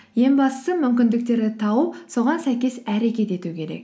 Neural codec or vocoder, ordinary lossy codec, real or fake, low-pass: none; none; real; none